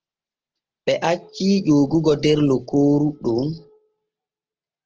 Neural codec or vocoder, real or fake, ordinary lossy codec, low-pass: none; real; Opus, 16 kbps; 7.2 kHz